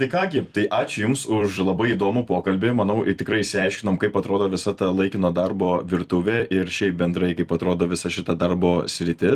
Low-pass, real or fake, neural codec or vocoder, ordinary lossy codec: 14.4 kHz; fake; vocoder, 44.1 kHz, 128 mel bands every 256 samples, BigVGAN v2; Opus, 24 kbps